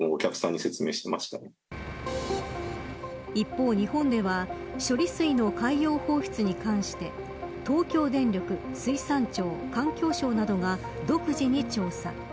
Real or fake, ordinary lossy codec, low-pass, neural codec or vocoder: real; none; none; none